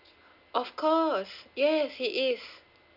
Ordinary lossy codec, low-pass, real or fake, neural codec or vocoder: none; 5.4 kHz; real; none